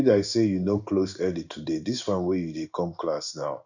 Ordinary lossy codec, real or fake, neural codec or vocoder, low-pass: none; fake; codec, 16 kHz in and 24 kHz out, 1 kbps, XY-Tokenizer; 7.2 kHz